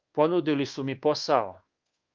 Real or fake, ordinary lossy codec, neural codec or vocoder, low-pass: fake; Opus, 32 kbps; codec, 24 kHz, 1.2 kbps, DualCodec; 7.2 kHz